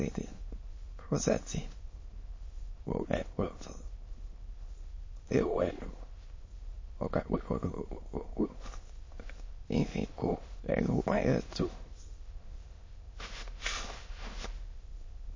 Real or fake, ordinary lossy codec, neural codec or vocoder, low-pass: fake; MP3, 32 kbps; autoencoder, 22.05 kHz, a latent of 192 numbers a frame, VITS, trained on many speakers; 7.2 kHz